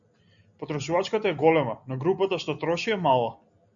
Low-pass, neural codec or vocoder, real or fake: 7.2 kHz; none; real